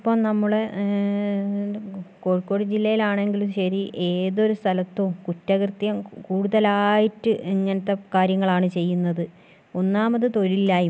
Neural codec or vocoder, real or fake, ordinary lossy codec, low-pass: none; real; none; none